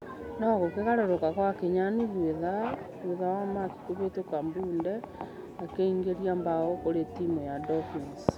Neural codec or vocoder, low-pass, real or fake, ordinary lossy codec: none; 19.8 kHz; real; none